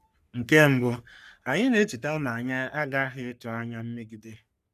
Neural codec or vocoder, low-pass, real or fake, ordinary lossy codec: codec, 44.1 kHz, 3.4 kbps, Pupu-Codec; 14.4 kHz; fake; none